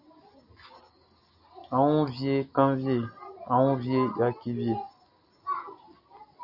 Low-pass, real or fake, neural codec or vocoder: 5.4 kHz; real; none